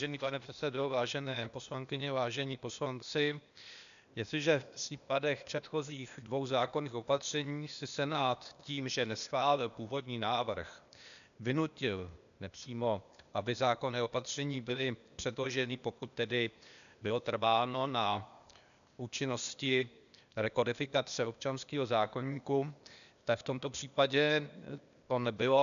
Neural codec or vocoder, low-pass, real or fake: codec, 16 kHz, 0.8 kbps, ZipCodec; 7.2 kHz; fake